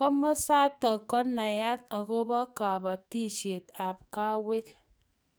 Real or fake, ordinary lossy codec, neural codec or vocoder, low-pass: fake; none; codec, 44.1 kHz, 2.6 kbps, SNAC; none